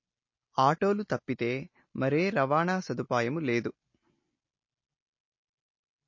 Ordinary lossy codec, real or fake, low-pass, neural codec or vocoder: MP3, 32 kbps; real; 7.2 kHz; none